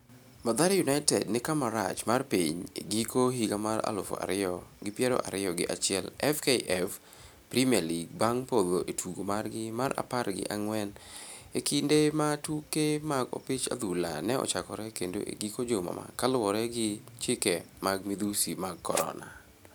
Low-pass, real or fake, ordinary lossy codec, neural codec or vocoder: none; real; none; none